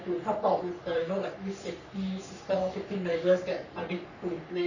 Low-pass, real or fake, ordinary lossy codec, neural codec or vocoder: 7.2 kHz; fake; none; codec, 44.1 kHz, 3.4 kbps, Pupu-Codec